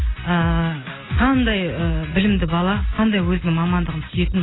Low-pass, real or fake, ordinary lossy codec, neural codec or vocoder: 7.2 kHz; real; AAC, 16 kbps; none